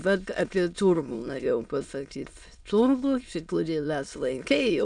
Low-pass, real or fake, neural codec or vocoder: 9.9 kHz; fake; autoencoder, 22.05 kHz, a latent of 192 numbers a frame, VITS, trained on many speakers